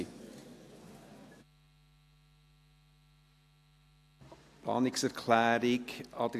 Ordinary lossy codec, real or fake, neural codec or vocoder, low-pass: AAC, 64 kbps; real; none; 14.4 kHz